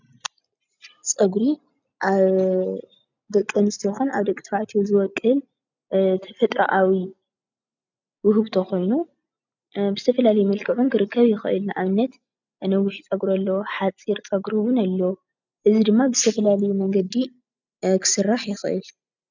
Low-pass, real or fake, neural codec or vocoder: 7.2 kHz; real; none